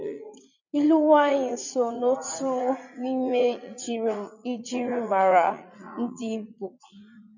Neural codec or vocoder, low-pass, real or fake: vocoder, 44.1 kHz, 80 mel bands, Vocos; 7.2 kHz; fake